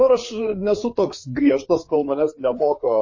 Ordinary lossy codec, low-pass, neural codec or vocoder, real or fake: MP3, 32 kbps; 7.2 kHz; codec, 16 kHz, 4 kbps, FreqCodec, larger model; fake